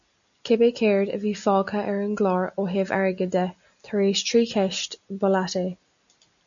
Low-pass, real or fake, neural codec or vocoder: 7.2 kHz; real; none